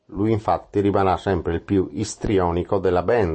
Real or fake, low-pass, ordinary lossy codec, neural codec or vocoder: real; 9.9 kHz; MP3, 32 kbps; none